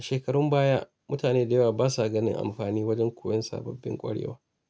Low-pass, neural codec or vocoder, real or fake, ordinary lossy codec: none; none; real; none